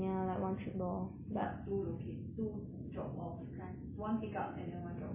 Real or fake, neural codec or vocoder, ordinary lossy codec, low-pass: real; none; MP3, 16 kbps; 3.6 kHz